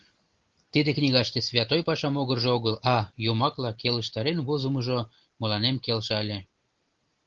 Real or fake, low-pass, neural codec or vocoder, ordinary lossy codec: real; 7.2 kHz; none; Opus, 16 kbps